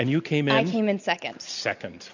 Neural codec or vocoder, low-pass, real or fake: none; 7.2 kHz; real